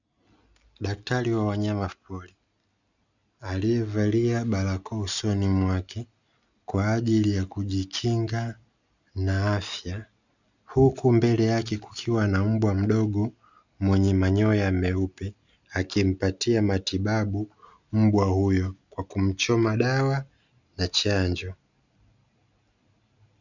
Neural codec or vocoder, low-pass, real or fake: none; 7.2 kHz; real